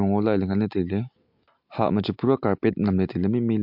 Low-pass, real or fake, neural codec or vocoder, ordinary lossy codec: 5.4 kHz; real; none; MP3, 48 kbps